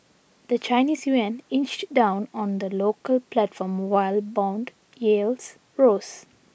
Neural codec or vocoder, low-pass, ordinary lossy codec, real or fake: none; none; none; real